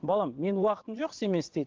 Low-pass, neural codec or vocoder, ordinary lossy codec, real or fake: 7.2 kHz; vocoder, 22.05 kHz, 80 mel bands, WaveNeXt; Opus, 16 kbps; fake